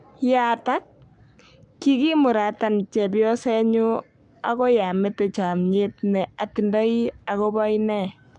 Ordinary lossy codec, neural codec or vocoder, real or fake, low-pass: MP3, 96 kbps; codec, 44.1 kHz, 7.8 kbps, Pupu-Codec; fake; 10.8 kHz